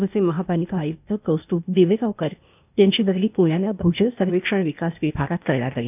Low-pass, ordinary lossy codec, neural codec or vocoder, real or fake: 3.6 kHz; none; codec, 16 kHz, 0.8 kbps, ZipCodec; fake